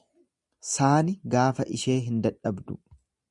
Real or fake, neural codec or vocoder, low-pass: real; none; 10.8 kHz